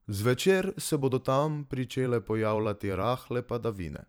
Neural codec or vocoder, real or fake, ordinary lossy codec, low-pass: vocoder, 44.1 kHz, 128 mel bands every 512 samples, BigVGAN v2; fake; none; none